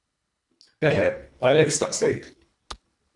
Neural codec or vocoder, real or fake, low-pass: codec, 24 kHz, 1.5 kbps, HILCodec; fake; 10.8 kHz